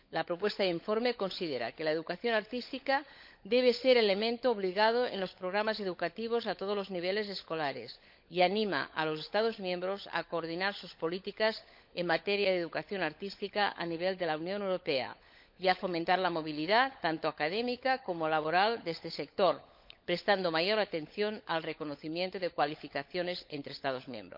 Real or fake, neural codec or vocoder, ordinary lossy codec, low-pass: fake; codec, 16 kHz, 16 kbps, FunCodec, trained on LibriTTS, 50 frames a second; MP3, 48 kbps; 5.4 kHz